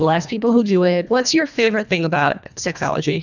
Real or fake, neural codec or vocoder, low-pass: fake; codec, 24 kHz, 1.5 kbps, HILCodec; 7.2 kHz